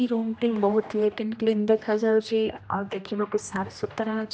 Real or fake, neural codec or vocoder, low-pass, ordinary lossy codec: fake; codec, 16 kHz, 1 kbps, X-Codec, HuBERT features, trained on general audio; none; none